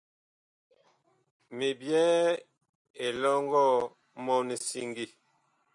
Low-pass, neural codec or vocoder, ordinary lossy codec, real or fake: 10.8 kHz; none; MP3, 96 kbps; real